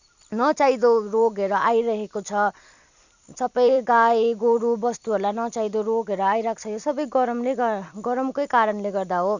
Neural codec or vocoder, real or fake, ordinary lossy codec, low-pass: vocoder, 44.1 kHz, 128 mel bands every 256 samples, BigVGAN v2; fake; none; 7.2 kHz